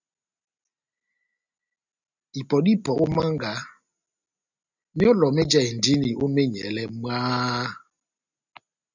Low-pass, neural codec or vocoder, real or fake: 7.2 kHz; none; real